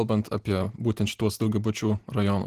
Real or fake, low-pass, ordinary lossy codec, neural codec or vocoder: real; 14.4 kHz; Opus, 16 kbps; none